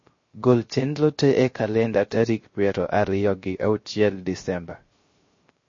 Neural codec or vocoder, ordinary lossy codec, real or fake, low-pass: codec, 16 kHz, 0.3 kbps, FocalCodec; MP3, 32 kbps; fake; 7.2 kHz